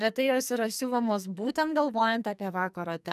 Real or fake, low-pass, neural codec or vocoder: fake; 14.4 kHz; codec, 44.1 kHz, 2.6 kbps, SNAC